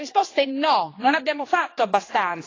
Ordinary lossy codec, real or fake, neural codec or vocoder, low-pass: AAC, 32 kbps; fake; codec, 16 kHz, 2 kbps, X-Codec, HuBERT features, trained on general audio; 7.2 kHz